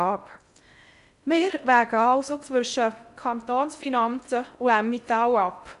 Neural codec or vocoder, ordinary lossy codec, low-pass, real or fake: codec, 16 kHz in and 24 kHz out, 0.6 kbps, FocalCodec, streaming, 2048 codes; none; 10.8 kHz; fake